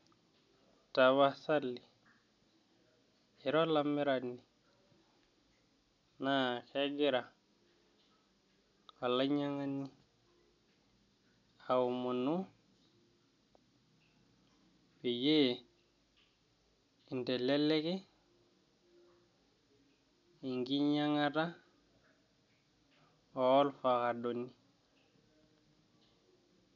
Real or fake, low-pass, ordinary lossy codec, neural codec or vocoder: real; 7.2 kHz; none; none